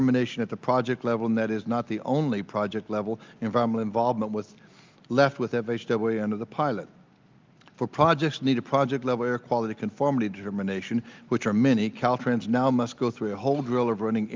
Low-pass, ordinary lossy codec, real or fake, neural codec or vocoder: 7.2 kHz; Opus, 24 kbps; real; none